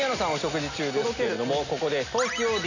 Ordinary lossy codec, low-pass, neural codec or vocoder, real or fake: none; 7.2 kHz; none; real